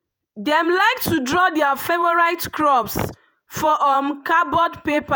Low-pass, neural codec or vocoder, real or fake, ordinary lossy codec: none; vocoder, 48 kHz, 128 mel bands, Vocos; fake; none